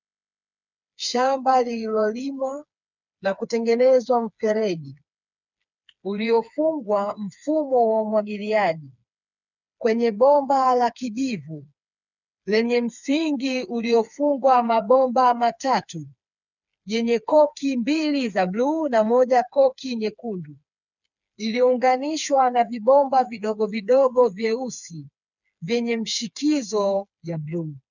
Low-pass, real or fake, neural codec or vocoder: 7.2 kHz; fake; codec, 16 kHz, 4 kbps, FreqCodec, smaller model